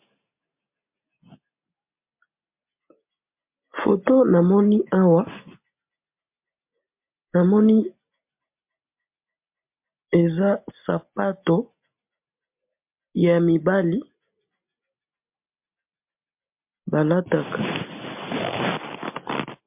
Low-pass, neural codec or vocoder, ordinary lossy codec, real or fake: 3.6 kHz; none; MP3, 32 kbps; real